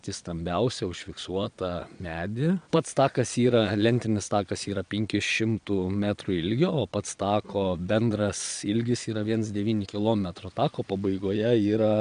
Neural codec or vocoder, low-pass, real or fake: vocoder, 22.05 kHz, 80 mel bands, Vocos; 9.9 kHz; fake